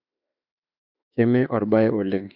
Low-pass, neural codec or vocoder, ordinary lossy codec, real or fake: 5.4 kHz; autoencoder, 48 kHz, 32 numbers a frame, DAC-VAE, trained on Japanese speech; none; fake